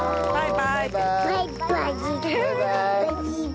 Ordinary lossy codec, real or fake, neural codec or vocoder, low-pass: none; real; none; none